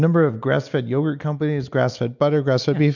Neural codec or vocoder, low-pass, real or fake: none; 7.2 kHz; real